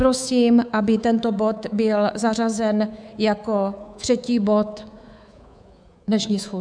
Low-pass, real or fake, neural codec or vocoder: 9.9 kHz; fake; codec, 24 kHz, 3.1 kbps, DualCodec